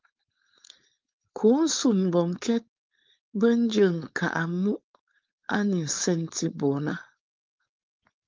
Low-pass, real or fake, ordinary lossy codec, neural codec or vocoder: 7.2 kHz; fake; Opus, 32 kbps; codec, 16 kHz, 4.8 kbps, FACodec